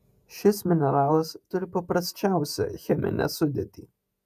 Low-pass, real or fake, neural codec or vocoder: 14.4 kHz; fake; vocoder, 48 kHz, 128 mel bands, Vocos